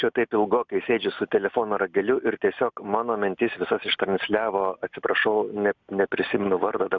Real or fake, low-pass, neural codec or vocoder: real; 7.2 kHz; none